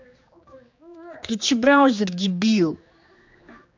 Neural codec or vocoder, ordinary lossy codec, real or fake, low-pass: codec, 16 kHz, 2 kbps, X-Codec, HuBERT features, trained on general audio; MP3, 64 kbps; fake; 7.2 kHz